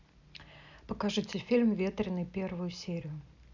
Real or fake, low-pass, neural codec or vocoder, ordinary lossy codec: real; 7.2 kHz; none; none